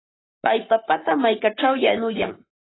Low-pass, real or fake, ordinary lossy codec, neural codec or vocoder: 7.2 kHz; fake; AAC, 16 kbps; codec, 44.1 kHz, 7.8 kbps, Pupu-Codec